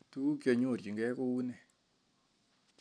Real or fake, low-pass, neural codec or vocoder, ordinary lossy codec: real; 9.9 kHz; none; none